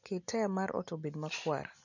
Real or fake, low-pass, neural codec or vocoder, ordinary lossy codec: real; 7.2 kHz; none; none